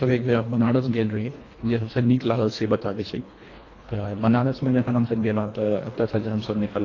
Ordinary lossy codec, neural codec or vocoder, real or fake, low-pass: AAC, 32 kbps; codec, 24 kHz, 1.5 kbps, HILCodec; fake; 7.2 kHz